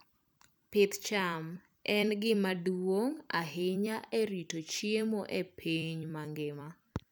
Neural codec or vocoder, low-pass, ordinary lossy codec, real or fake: vocoder, 44.1 kHz, 128 mel bands every 256 samples, BigVGAN v2; none; none; fake